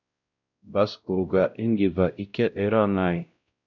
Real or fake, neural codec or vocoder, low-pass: fake; codec, 16 kHz, 0.5 kbps, X-Codec, WavLM features, trained on Multilingual LibriSpeech; 7.2 kHz